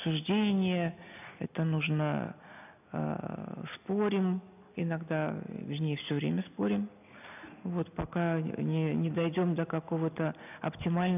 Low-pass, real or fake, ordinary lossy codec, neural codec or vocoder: 3.6 kHz; real; none; none